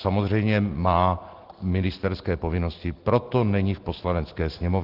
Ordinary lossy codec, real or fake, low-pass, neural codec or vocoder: Opus, 16 kbps; real; 5.4 kHz; none